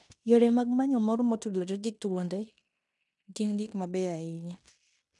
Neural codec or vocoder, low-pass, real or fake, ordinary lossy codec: codec, 16 kHz in and 24 kHz out, 0.9 kbps, LongCat-Audio-Codec, fine tuned four codebook decoder; 10.8 kHz; fake; none